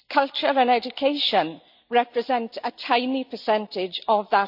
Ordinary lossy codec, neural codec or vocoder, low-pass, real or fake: none; none; 5.4 kHz; real